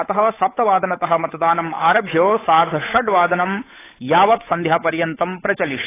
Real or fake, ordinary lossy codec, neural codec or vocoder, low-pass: fake; AAC, 16 kbps; codec, 16 kHz, 8 kbps, FunCodec, trained on Chinese and English, 25 frames a second; 3.6 kHz